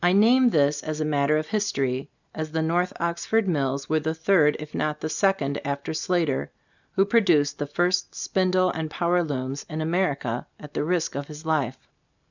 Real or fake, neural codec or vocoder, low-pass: real; none; 7.2 kHz